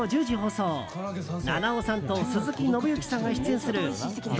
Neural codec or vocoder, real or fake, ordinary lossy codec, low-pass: none; real; none; none